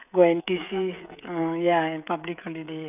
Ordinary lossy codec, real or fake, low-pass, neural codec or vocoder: none; fake; 3.6 kHz; codec, 16 kHz, 16 kbps, FreqCodec, smaller model